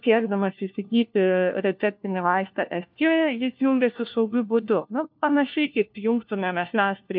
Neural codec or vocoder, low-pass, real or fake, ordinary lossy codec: codec, 16 kHz, 1 kbps, FunCodec, trained on LibriTTS, 50 frames a second; 5.4 kHz; fake; MP3, 48 kbps